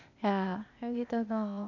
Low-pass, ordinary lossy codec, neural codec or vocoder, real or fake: 7.2 kHz; none; codec, 16 kHz, 0.8 kbps, ZipCodec; fake